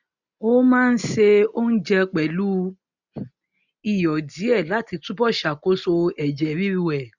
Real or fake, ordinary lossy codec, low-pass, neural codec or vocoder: real; none; 7.2 kHz; none